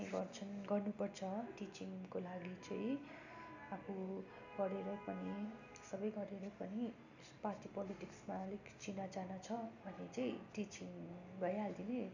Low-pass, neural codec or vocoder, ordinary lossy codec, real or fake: 7.2 kHz; none; none; real